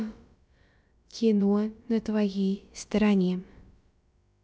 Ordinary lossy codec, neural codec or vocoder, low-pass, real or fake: none; codec, 16 kHz, about 1 kbps, DyCAST, with the encoder's durations; none; fake